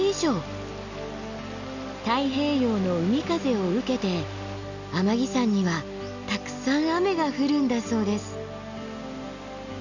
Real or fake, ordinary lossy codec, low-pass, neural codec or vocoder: real; none; 7.2 kHz; none